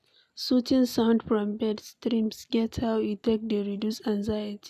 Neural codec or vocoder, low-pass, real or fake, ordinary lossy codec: none; 14.4 kHz; real; none